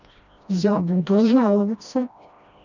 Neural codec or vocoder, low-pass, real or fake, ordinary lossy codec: codec, 16 kHz, 1 kbps, FreqCodec, smaller model; 7.2 kHz; fake; none